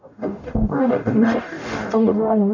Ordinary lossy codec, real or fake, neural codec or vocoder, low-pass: none; fake; codec, 44.1 kHz, 0.9 kbps, DAC; 7.2 kHz